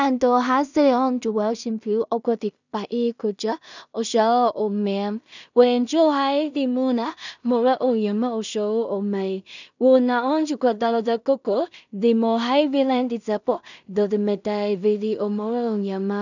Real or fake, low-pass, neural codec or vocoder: fake; 7.2 kHz; codec, 16 kHz in and 24 kHz out, 0.4 kbps, LongCat-Audio-Codec, two codebook decoder